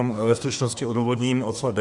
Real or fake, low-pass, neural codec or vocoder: fake; 10.8 kHz; codec, 24 kHz, 1 kbps, SNAC